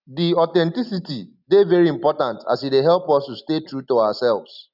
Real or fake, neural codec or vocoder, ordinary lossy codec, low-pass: real; none; none; 5.4 kHz